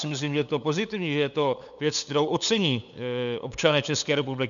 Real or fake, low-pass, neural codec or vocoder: fake; 7.2 kHz; codec, 16 kHz, 8 kbps, FunCodec, trained on LibriTTS, 25 frames a second